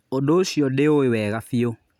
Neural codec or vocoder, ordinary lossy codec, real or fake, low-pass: none; none; real; 19.8 kHz